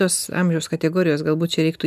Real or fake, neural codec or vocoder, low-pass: real; none; 14.4 kHz